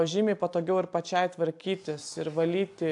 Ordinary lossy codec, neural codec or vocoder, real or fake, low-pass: AAC, 64 kbps; none; real; 10.8 kHz